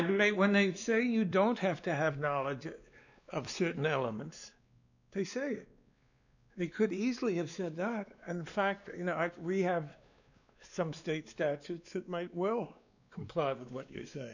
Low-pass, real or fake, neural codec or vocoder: 7.2 kHz; fake; codec, 16 kHz, 2 kbps, X-Codec, WavLM features, trained on Multilingual LibriSpeech